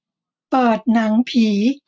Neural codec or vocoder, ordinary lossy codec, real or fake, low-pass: none; none; real; none